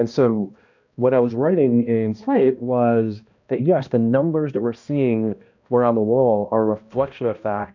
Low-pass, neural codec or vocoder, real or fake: 7.2 kHz; codec, 16 kHz, 1 kbps, X-Codec, HuBERT features, trained on balanced general audio; fake